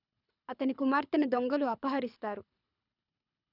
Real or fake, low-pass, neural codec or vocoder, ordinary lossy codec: fake; 5.4 kHz; codec, 24 kHz, 6 kbps, HILCodec; none